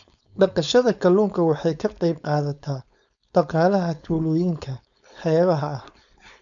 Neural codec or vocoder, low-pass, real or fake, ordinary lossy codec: codec, 16 kHz, 4.8 kbps, FACodec; 7.2 kHz; fake; none